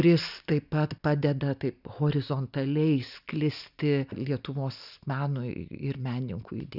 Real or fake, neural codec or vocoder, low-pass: real; none; 5.4 kHz